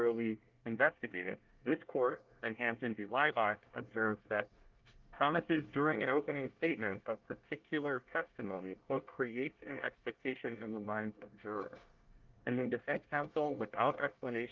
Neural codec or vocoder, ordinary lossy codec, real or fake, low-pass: codec, 24 kHz, 1 kbps, SNAC; Opus, 32 kbps; fake; 7.2 kHz